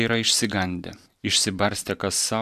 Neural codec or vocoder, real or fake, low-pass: vocoder, 44.1 kHz, 128 mel bands every 512 samples, BigVGAN v2; fake; 14.4 kHz